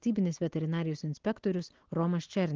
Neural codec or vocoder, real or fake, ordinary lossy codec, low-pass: none; real; Opus, 16 kbps; 7.2 kHz